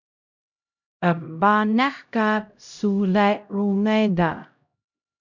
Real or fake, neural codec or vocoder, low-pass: fake; codec, 16 kHz, 0.5 kbps, X-Codec, HuBERT features, trained on LibriSpeech; 7.2 kHz